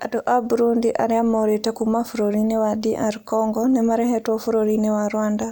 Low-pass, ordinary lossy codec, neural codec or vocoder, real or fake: none; none; none; real